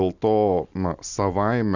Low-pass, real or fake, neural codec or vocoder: 7.2 kHz; real; none